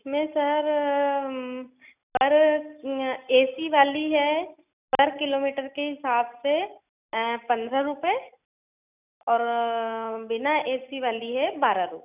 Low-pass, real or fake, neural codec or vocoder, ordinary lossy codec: 3.6 kHz; real; none; none